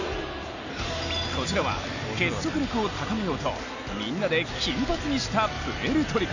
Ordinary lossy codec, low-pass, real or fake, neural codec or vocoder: none; 7.2 kHz; real; none